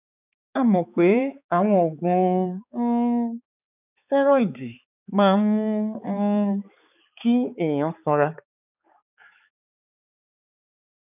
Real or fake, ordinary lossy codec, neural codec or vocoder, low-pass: fake; none; codec, 16 kHz, 4 kbps, X-Codec, HuBERT features, trained on balanced general audio; 3.6 kHz